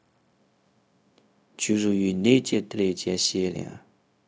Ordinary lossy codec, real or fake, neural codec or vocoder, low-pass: none; fake; codec, 16 kHz, 0.4 kbps, LongCat-Audio-Codec; none